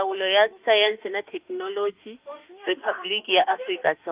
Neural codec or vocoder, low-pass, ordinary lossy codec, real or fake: autoencoder, 48 kHz, 32 numbers a frame, DAC-VAE, trained on Japanese speech; 3.6 kHz; Opus, 32 kbps; fake